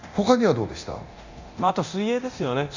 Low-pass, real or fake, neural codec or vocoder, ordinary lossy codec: 7.2 kHz; fake; codec, 24 kHz, 0.9 kbps, DualCodec; Opus, 64 kbps